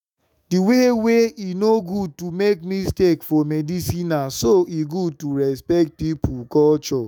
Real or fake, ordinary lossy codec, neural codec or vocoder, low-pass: fake; none; autoencoder, 48 kHz, 128 numbers a frame, DAC-VAE, trained on Japanese speech; 19.8 kHz